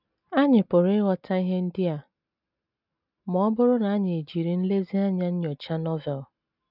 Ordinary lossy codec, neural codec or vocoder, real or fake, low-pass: none; none; real; 5.4 kHz